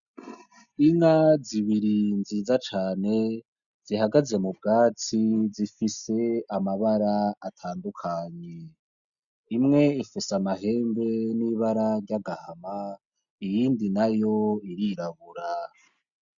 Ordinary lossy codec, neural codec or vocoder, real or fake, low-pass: MP3, 96 kbps; none; real; 7.2 kHz